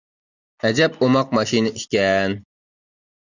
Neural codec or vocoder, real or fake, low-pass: none; real; 7.2 kHz